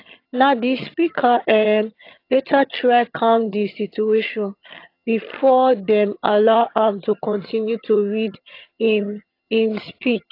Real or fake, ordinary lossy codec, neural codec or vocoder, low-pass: fake; AAC, 32 kbps; vocoder, 22.05 kHz, 80 mel bands, HiFi-GAN; 5.4 kHz